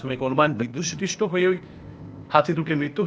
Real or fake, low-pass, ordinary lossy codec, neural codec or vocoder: fake; none; none; codec, 16 kHz, 0.8 kbps, ZipCodec